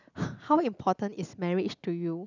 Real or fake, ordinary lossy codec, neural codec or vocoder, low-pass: real; none; none; 7.2 kHz